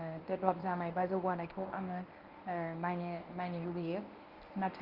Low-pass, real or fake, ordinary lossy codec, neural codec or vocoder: 5.4 kHz; fake; Opus, 32 kbps; codec, 24 kHz, 0.9 kbps, WavTokenizer, medium speech release version 1